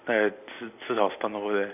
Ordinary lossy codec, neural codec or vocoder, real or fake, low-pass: none; none; real; 3.6 kHz